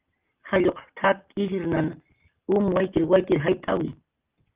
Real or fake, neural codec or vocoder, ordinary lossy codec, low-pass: real; none; Opus, 24 kbps; 3.6 kHz